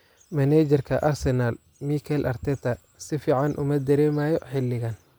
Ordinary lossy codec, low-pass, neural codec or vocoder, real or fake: none; none; none; real